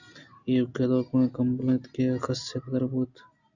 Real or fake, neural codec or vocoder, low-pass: real; none; 7.2 kHz